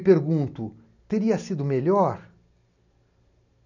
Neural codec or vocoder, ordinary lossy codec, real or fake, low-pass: none; none; real; 7.2 kHz